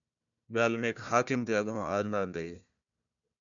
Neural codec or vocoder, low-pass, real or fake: codec, 16 kHz, 1 kbps, FunCodec, trained on Chinese and English, 50 frames a second; 7.2 kHz; fake